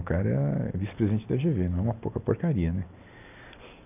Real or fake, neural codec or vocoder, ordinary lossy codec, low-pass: real; none; none; 3.6 kHz